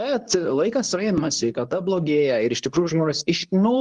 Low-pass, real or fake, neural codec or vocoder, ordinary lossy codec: 10.8 kHz; fake; codec, 24 kHz, 0.9 kbps, WavTokenizer, medium speech release version 2; Opus, 32 kbps